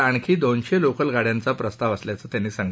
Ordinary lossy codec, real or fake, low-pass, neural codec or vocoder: none; real; none; none